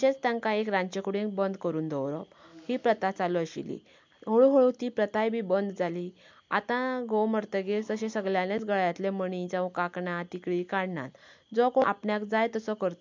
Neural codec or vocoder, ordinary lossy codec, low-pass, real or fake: none; MP3, 64 kbps; 7.2 kHz; real